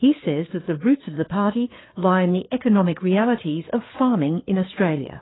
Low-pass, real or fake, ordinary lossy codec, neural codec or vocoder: 7.2 kHz; fake; AAC, 16 kbps; codec, 16 kHz, 4 kbps, FreqCodec, larger model